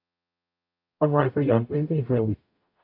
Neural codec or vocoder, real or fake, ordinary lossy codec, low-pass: codec, 44.1 kHz, 0.9 kbps, DAC; fake; AAC, 48 kbps; 5.4 kHz